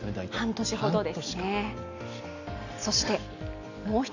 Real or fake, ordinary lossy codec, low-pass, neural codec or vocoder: real; none; 7.2 kHz; none